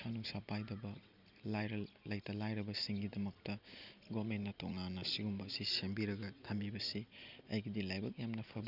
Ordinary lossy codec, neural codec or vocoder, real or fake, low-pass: none; none; real; 5.4 kHz